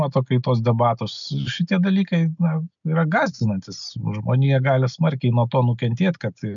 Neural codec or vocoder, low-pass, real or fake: none; 7.2 kHz; real